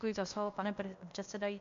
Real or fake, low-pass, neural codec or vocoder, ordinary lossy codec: fake; 7.2 kHz; codec, 16 kHz, 0.8 kbps, ZipCodec; MP3, 64 kbps